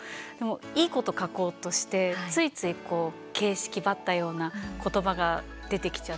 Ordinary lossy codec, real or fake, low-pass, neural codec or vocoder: none; real; none; none